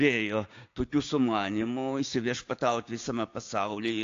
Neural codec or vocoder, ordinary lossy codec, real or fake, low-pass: codec, 16 kHz, 6 kbps, DAC; AAC, 48 kbps; fake; 7.2 kHz